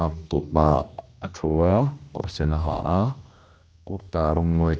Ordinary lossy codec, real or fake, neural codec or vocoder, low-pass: none; fake; codec, 16 kHz, 1 kbps, X-Codec, HuBERT features, trained on general audio; none